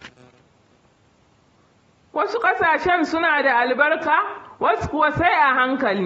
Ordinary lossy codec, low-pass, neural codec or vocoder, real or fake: AAC, 24 kbps; 19.8 kHz; none; real